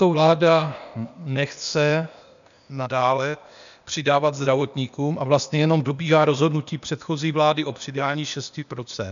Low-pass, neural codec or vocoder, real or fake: 7.2 kHz; codec, 16 kHz, 0.8 kbps, ZipCodec; fake